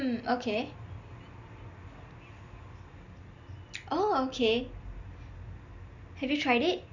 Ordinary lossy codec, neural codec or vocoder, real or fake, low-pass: none; none; real; 7.2 kHz